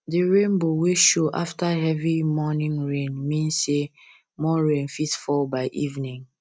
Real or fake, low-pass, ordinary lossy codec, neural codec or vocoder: real; none; none; none